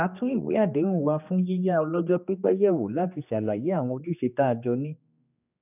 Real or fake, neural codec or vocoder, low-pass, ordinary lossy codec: fake; codec, 16 kHz, 2 kbps, X-Codec, HuBERT features, trained on general audio; 3.6 kHz; none